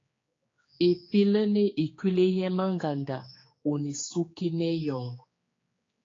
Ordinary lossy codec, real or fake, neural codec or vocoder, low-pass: AAC, 32 kbps; fake; codec, 16 kHz, 4 kbps, X-Codec, HuBERT features, trained on general audio; 7.2 kHz